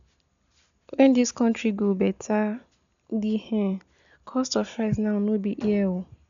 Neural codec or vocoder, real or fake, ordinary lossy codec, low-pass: none; real; none; 7.2 kHz